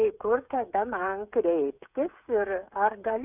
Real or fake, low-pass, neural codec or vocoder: fake; 3.6 kHz; codec, 16 kHz, 8 kbps, FreqCodec, smaller model